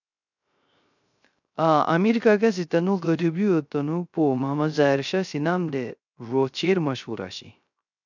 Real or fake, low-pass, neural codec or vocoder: fake; 7.2 kHz; codec, 16 kHz, 0.3 kbps, FocalCodec